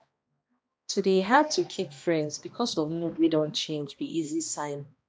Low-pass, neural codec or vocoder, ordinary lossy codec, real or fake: none; codec, 16 kHz, 1 kbps, X-Codec, HuBERT features, trained on balanced general audio; none; fake